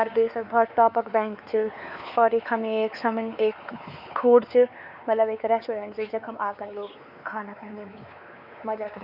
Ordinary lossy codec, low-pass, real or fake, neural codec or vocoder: none; 5.4 kHz; fake; codec, 16 kHz, 4 kbps, X-Codec, WavLM features, trained on Multilingual LibriSpeech